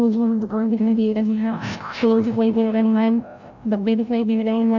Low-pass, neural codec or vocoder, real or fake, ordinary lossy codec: 7.2 kHz; codec, 16 kHz, 0.5 kbps, FreqCodec, larger model; fake; none